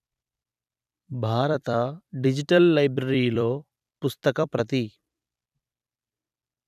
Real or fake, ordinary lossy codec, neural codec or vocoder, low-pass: fake; none; vocoder, 44.1 kHz, 128 mel bands every 256 samples, BigVGAN v2; 14.4 kHz